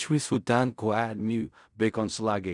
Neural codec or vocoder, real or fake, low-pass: codec, 16 kHz in and 24 kHz out, 0.4 kbps, LongCat-Audio-Codec, fine tuned four codebook decoder; fake; 10.8 kHz